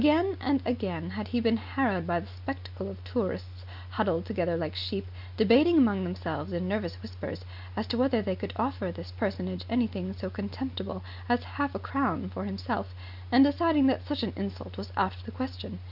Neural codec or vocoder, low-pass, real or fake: none; 5.4 kHz; real